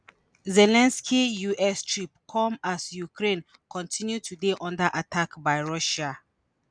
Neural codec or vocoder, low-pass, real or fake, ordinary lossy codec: none; 9.9 kHz; real; none